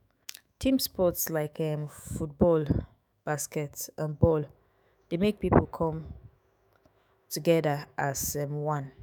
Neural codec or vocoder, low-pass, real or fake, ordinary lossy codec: autoencoder, 48 kHz, 128 numbers a frame, DAC-VAE, trained on Japanese speech; none; fake; none